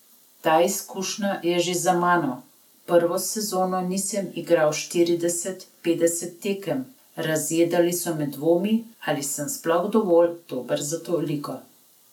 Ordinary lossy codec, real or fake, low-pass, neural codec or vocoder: none; real; 19.8 kHz; none